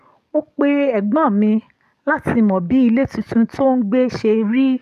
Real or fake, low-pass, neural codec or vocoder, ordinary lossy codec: fake; 14.4 kHz; codec, 44.1 kHz, 7.8 kbps, DAC; none